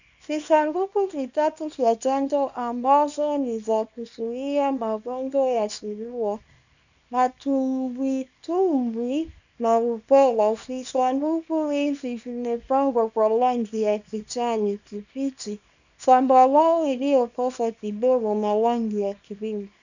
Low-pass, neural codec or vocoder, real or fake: 7.2 kHz; codec, 24 kHz, 0.9 kbps, WavTokenizer, small release; fake